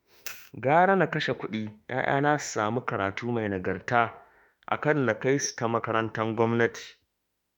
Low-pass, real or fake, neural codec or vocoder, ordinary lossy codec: none; fake; autoencoder, 48 kHz, 32 numbers a frame, DAC-VAE, trained on Japanese speech; none